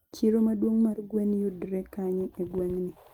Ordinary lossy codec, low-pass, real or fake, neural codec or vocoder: Opus, 32 kbps; 19.8 kHz; real; none